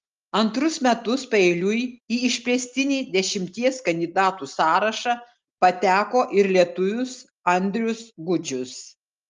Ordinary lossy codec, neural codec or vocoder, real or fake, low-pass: Opus, 32 kbps; none; real; 7.2 kHz